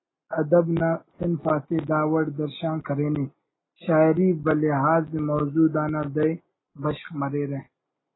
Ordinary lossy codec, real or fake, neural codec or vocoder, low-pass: AAC, 16 kbps; real; none; 7.2 kHz